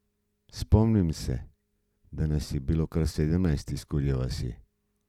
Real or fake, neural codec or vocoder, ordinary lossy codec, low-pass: real; none; none; 19.8 kHz